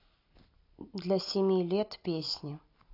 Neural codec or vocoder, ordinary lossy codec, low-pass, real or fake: none; AAC, 32 kbps; 5.4 kHz; real